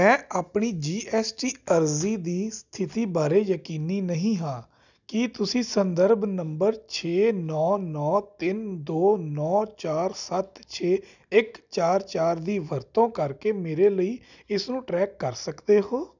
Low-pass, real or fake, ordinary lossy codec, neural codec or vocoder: 7.2 kHz; real; none; none